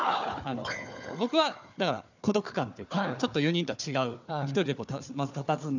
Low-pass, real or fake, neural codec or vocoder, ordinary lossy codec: 7.2 kHz; fake; codec, 16 kHz, 4 kbps, FunCodec, trained on Chinese and English, 50 frames a second; none